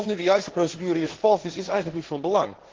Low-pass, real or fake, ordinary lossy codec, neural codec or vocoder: 7.2 kHz; fake; Opus, 16 kbps; codec, 16 kHz, 1.1 kbps, Voila-Tokenizer